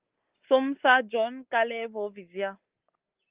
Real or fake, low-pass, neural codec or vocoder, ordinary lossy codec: real; 3.6 kHz; none; Opus, 32 kbps